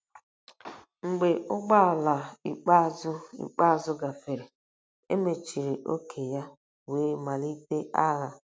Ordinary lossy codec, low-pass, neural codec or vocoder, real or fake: none; none; none; real